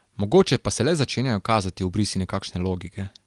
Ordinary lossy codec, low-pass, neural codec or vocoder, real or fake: Opus, 24 kbps; 10.8 kHz; none; real